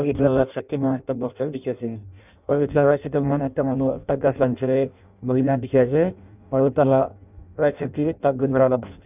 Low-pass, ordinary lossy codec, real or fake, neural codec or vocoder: 3.6 kHz; none; fake; codec, 16 kHz in and 24 kHz out, 0.6 kbps, FireRedTTS-2 codec